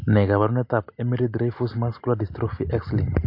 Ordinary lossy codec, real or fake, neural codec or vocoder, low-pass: MP3, 32 kbps; real; none; 5.4 kHz